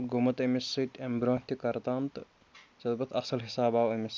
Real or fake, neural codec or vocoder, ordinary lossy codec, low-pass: real; none; none; none